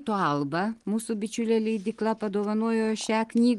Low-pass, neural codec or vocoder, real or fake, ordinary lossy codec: 10.8 kHz; none; real; Opus, 24 kbps